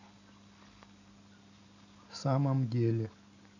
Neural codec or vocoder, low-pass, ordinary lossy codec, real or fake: none; 7.2 kHz; none; real